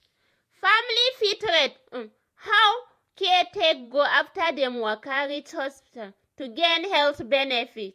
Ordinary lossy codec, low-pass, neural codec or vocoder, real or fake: MP3, 64 kbps; 14.4 kHz; vocoder, 48 kHz, 128 mel bands, Vocos; fake